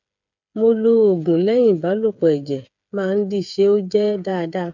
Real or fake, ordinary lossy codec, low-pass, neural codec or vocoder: fake; none; 7.2 kHz; codec, 16 kHz, 8 kbps, FreqCodec, smaller model